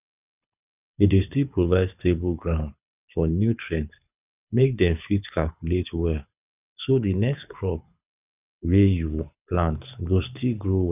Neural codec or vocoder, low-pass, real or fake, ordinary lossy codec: codec, 24 kHz, 6 kbps, HILCodec; 3.6 kHz; fake; none